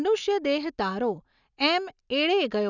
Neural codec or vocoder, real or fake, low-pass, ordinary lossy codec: none; real; 7.2 kHz; none